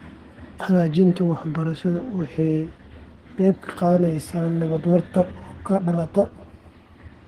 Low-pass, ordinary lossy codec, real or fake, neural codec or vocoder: 14.4 kHz; Opus, 16 kbps; fake; codec, 32 kHz, 1.9 kbps, SNAC